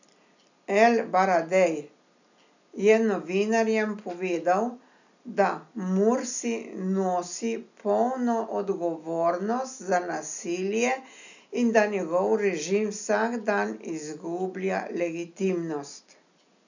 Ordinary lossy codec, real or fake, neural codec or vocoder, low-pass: none; real; none; 7.2 kHz